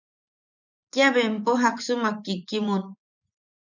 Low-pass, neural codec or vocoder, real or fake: 7.2 kHz; vocoder, 24 kHz, 100 mel bands, Vocos; fake